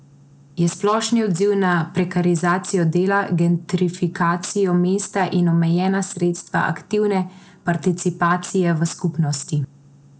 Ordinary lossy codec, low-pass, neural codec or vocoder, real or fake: none; none; none; real